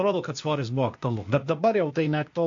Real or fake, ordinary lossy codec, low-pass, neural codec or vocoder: fake; MP3, 48 kbps; 7.2 kHz; codec, 16 kHz, 0.8 kbps, ZipCodec